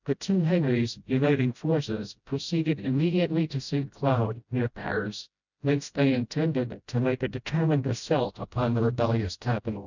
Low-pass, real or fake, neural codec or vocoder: 7.2 kHz; fake; codec, 16 kHz, 0.5 kbps, FreqCodec, smaller model